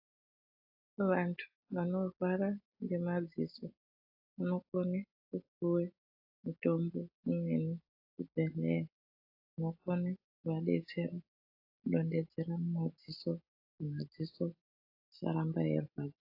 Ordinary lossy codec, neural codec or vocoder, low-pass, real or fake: AAC, 32 kbps; none; 5.4 kHz; real